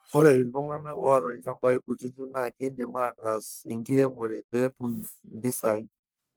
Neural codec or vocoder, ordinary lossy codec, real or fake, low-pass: codec, 44.1 kHz, 1.7 kbps, Pupu-Codec; none; fake; none